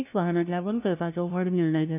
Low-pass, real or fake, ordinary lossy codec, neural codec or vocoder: 3.6 kHz; fake; none; codec, 16 kHz, 0.5 kbps, FunCodec, trained on LibriTTS, 25 frames a second